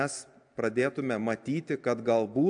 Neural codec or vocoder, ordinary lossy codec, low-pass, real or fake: none; AAC, 64 kbps; 9.9 kHz; real